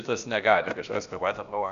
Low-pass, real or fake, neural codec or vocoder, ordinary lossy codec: 7.2 kHz; fake; codec, 16 kHz, about 1 kbps, DyCAST, with the encoder's durations; Opus, 64 kbps